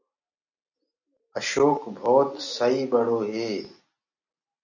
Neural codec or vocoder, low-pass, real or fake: none; 7.2 kHz; real